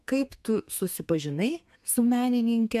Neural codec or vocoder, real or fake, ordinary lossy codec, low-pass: codec, 32 kHz, 1.9 kbps, SNAC; fake; MP3, 96 kbps; 14.4 kHz